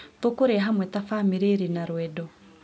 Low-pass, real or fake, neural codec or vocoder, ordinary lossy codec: none; real; none; none